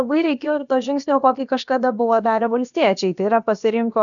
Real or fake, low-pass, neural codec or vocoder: fake; 7.2 kHz; codec, 16 kHz, about 1 kbps, DyCAST, with the encoder's durations